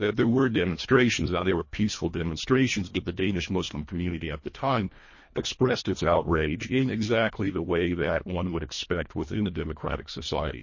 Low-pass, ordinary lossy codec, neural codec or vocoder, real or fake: 7.2 kHz; MP3, 32 kbps; codec, 24 kHz, 1.5 kbps, HILCodec; fake